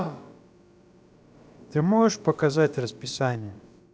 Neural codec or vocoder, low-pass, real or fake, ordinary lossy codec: codec, 16 kHz, about 1 kbps, DyCAST, with the encoder's durations; none; fake; none